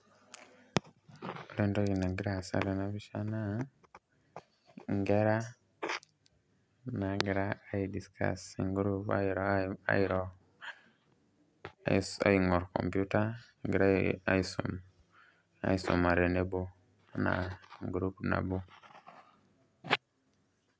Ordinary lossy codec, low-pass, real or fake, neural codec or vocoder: none; none; real; none